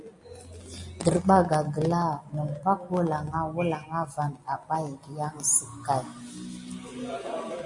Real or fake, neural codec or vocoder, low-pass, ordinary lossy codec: real; none; 10.8 kHz; MP3, 48 kbps